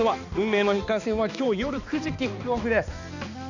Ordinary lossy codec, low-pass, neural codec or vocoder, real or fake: none; 7.2 kHz; codec, 16 kHz, 2 kbps, X-Codec, HuBERT features, trained on balanced general audio; fake